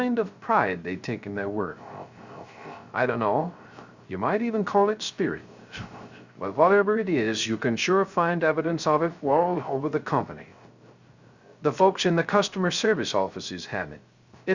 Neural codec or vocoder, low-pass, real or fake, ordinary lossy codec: codec, 16 kHz, 0.3 kbps, FocalCodec; 7.2 kHz; fake; Opus, 64 kbps